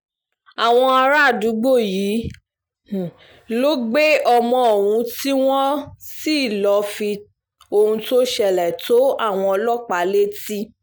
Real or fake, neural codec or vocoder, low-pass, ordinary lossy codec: real; none; none; none